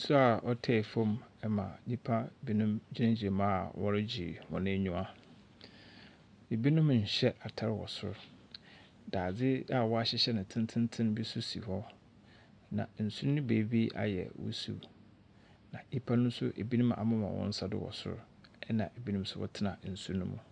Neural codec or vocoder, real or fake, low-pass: none; real; 9.9 kHz